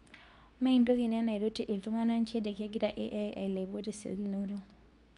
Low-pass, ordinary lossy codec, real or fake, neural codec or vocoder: 10.8 kHz; none; fake; codec, 24 kHz, 0.9 kbps, WavTokenizer, medium speech release version 2